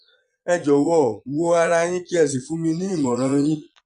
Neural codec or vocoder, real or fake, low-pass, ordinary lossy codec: vocoder, 22.05 kHz, 80 mel bands, Vocos; fake; 9.9 kHz; MP3, 96 kbps